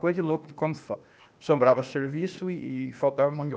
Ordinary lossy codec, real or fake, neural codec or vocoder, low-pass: none; fake; codec, 16 kHz, 0.8 kbps, ZipCodec; none